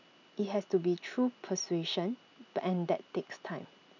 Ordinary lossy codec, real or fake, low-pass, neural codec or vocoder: none; real; 7.2 kHz; none